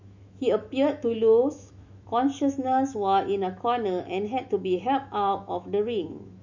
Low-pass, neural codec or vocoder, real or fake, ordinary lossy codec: 7.2 kHz; autoencoder, 48 kHz, 128 numbers a frame, DAC-VAE, trained on Japanese speech; fake; none